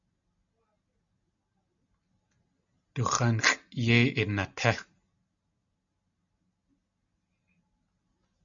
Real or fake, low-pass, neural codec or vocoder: real; 7.2 kHz; none